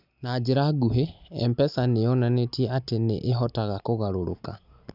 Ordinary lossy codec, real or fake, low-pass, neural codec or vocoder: none; real; 5.4 kHz; none